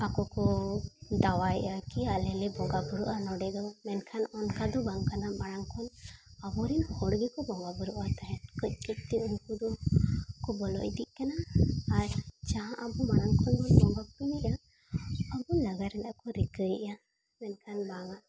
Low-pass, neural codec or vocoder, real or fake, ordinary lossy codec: none; none; real; none